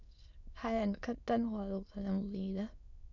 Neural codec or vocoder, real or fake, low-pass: autoencoder, 22.05 kHz, a latent of 192 numbers a frame, VITS, trained on many speakers; fake; 7.2 kHz